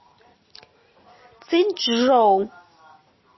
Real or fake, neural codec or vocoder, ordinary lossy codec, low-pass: real; none; MP3, 24 kbps; 7.2 kHz